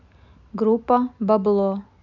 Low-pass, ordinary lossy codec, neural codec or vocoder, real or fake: 7.2 kHz; none; none; real